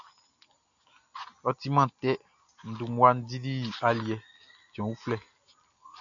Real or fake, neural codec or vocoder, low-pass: real; none; 7.2 kHz